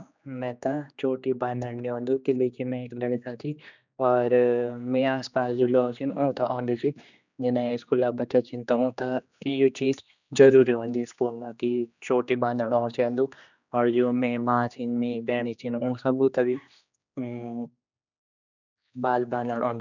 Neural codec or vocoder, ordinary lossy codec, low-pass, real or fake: codec, 16 kHz, 2 kbps, X-Codec, HuBERT features, trained on general audio; none; 7.2 kHz; fake